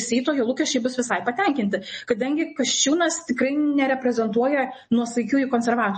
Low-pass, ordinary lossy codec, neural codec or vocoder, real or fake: 10.8 kHz; MP3, 32 kbps; none; real